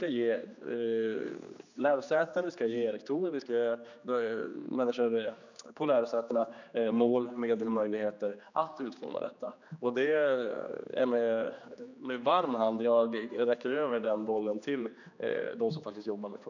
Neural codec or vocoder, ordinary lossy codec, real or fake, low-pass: codec, 16 kHz, 2 kbps, X-Codec, HuBERT features, trained on general audio; none; fake; 7.2 kHz